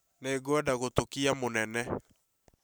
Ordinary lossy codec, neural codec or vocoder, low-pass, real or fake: none; none; none; real